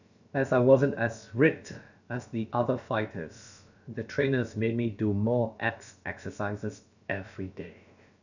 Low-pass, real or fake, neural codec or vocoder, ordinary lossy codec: 7.2 kHz; fake; codec, 16 kHz, about 1 kbps, DyCAST, with the encoder's durations; none